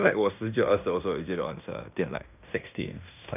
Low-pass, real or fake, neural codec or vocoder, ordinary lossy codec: 3.6 kHz; fake; codec, 16 kHz in and 24 kHz out, 0.9 kbps, LongCat-Audio-Codec, fine tuned four codebook decoder; none